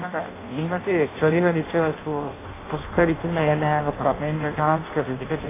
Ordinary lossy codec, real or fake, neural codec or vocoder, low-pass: AAC, 16 kbps; fake; codec, 16 kHz in and 24 kHz out, 0.6 kbps, FireRedTTS-2 codec; 3.6 kHz